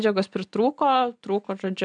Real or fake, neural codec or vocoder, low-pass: real; none; 9.9 kHz